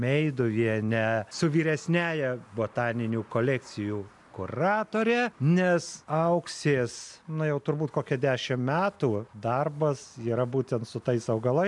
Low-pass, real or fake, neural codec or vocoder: 10.8 kHz; real; none